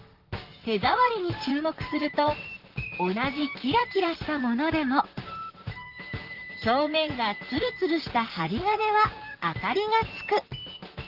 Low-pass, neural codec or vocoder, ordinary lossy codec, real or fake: 5.4 kHz; vocoder, 44.1 kHz, 128 mel bands, Pupu-Vocoder; Opus, 16 kbps; fake